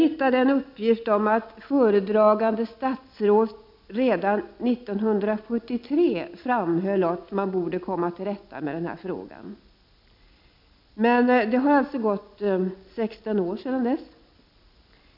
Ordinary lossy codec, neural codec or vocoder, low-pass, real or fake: none; none; 5.4 kHz; real